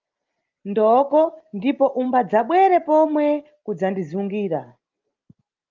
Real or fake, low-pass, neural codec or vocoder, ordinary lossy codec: real; 7.2 kHz; none; Opus, 32 kbps